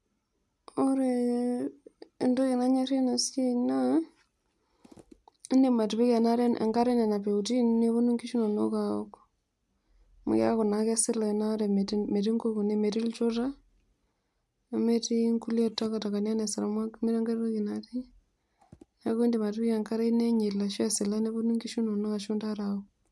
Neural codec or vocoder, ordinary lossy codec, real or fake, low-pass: none; none; real; none